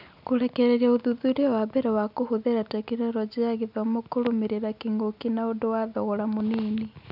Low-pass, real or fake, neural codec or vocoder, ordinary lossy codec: 5.4 kHz; real; none; none